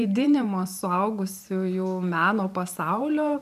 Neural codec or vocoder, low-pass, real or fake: vocoder, 44.1 kHz, 128 mel bands every 512 samples, BigVGAN v2; 14.4 kHz; fake